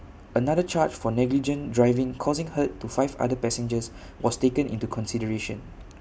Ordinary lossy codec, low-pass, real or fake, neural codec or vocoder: none; none; real; none